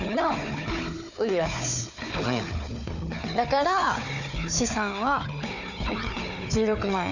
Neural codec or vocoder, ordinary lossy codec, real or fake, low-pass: codec, 16 kHz, 4 kbps, FunCodec, trained on Chinese and English, 50 frames a second; none; fake; 7.2 kHz